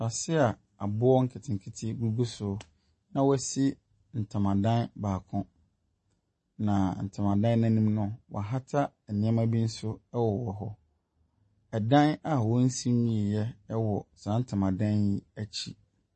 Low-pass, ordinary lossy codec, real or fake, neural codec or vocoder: 9.9 kHz; MP3, 32 kbps; real; none